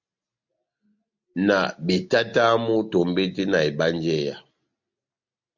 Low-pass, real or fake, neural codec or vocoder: 7.2 kHz; real; none